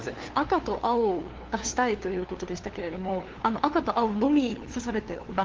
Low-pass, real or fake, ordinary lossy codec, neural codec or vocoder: 7.2 kHz; fake; Opus, 16 kbps; codec, 16 kHz, 2 kbps, FunCodec, trained on LibriTTS, 25 frames a second